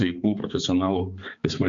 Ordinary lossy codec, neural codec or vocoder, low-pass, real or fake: MP3, 64 kbps; codec, 16 kHz, 4 kbps, X-Codec, HuBERT features, trained on balanced general audio; 7.2 kHz; fake